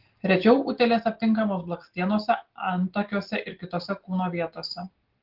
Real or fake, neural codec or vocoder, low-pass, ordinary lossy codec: real; none; 5.4 kHz; Opus, 16 kbps